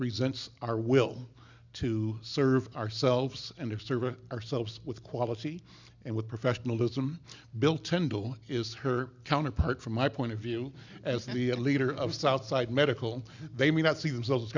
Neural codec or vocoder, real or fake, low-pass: none; real; 7.2 kHz